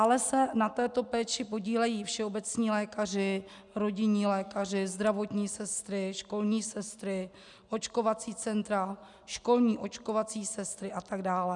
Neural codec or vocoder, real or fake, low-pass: none; real; 10.8 kHz